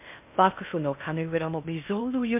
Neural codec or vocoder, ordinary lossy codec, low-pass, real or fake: codec, 16 kHz in and 24 kHz out, 0.6 kbps, FocalCodec, streaming, 2048 codes; MP3, 32 kbps; 3.6 kHz; fake